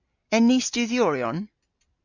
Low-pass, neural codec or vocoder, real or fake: 7.2 kHz; none; real